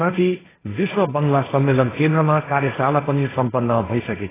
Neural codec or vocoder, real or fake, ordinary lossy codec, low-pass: codec, 16 kHz, 1.1 kbps, Voila-Tokenizer; fake; AAC, 16 kbps; 3.6 kHz